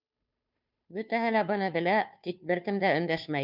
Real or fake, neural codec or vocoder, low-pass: fake; codec, 16 kHz, 2 kbps, FunCodec, trained on Chinese and English, 25 frames a second; 5.4 kHz